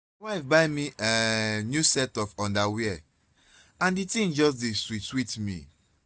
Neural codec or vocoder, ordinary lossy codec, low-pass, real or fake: none; none; none; real